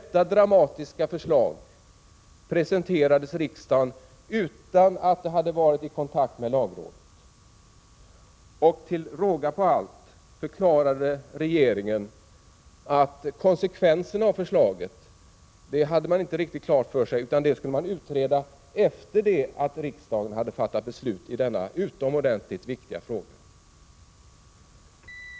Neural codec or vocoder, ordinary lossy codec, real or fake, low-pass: none; none; real; none